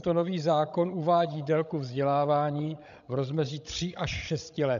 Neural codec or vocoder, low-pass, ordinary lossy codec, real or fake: codec, 16 kHz, 16 kbps, FreqCodec, larger model; 7.2 kHz; AAC, 96 kbps; fake